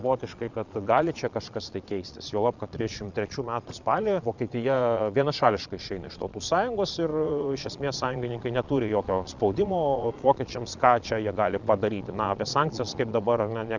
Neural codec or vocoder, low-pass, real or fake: vocoder, 22.05 kHz, 80 mel bands, Vocos; 7.2 kHz; fake